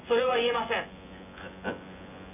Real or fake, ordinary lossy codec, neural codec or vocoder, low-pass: fake; none; vocoder, 24 kHz, 100 mel bands, Vocos; 3.6 kHz